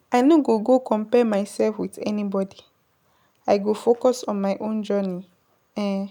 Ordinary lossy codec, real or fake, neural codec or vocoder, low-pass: none; real; none; 19.8 kHz